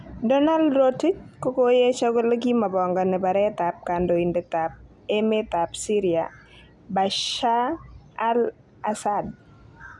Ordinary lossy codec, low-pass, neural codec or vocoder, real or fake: none; none; none; real